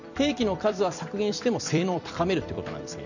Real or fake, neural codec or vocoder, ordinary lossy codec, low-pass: fake; vocoder, 44.1 kHz, 128 mel bands every 256 samples, BigVGAN v2; none; 7.2 kHz